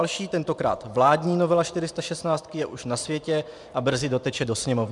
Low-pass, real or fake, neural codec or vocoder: 10.8 kHz; fake; vocoder, 44.1 kHz, 128 mel bands every 512 samples, BigVGAN v2